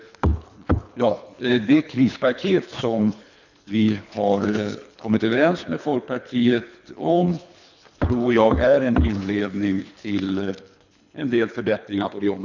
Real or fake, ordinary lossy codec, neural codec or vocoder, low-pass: fake; none; codec, 24 kHz, 3 kbps, HILCodec; 7.2 kHz